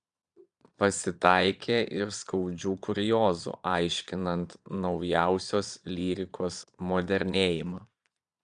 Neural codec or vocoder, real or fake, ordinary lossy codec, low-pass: vocoder, 22.05 kHz, 80 mel bands, Vocos; fake; Opus, 64 kbps; 9.9 kHz